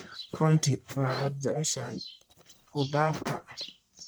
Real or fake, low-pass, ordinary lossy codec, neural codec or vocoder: fake; none; none; codec, 44.1 kHz, 1.7 kbps, Pupu-Codec